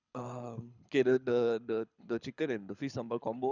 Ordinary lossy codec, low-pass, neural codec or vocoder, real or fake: none; 7.2 kHz; codec, 24 kHz, 6 kbps, HILCodec; fake